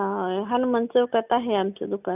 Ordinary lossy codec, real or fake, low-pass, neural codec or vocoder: none; real; 3.6 kHz; none